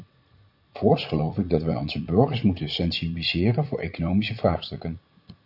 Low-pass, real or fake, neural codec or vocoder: 5.4 kHz; fake; vocoder, 44.1 kHz, 80 mel bands, Vocos